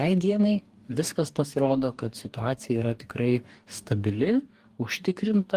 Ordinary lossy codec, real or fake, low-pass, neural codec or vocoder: Opus, 24 kbps; fake; 14.4 kHz; codec, 44.1 kHz, 2.6 kbps, DAC